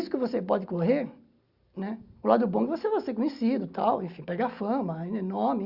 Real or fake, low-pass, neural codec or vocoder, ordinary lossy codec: real; 5.4 kHz; none; Opus, 64 kbps